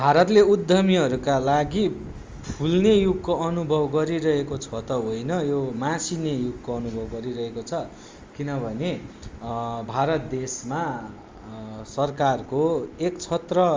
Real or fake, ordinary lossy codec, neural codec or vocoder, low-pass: real; Opus, 32 kbps; none; 7.2 kHz